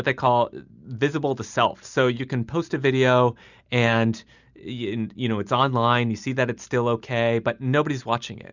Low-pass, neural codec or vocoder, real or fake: 7.2 kHz; none; real